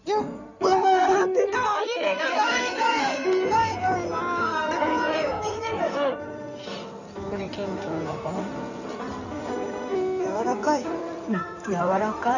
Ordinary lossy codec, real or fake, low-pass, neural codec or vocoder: none; fake; 7.2 kHz; codec, 16 kHz in and 24 kHz out, 2.2 kbps, FireRedTTS-2 codec